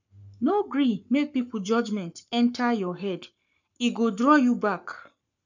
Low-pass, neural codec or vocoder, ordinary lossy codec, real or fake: 7.2 kHz; codec, 44.1 kHz, 7.8 kbps, Pupu-Codec; none; fake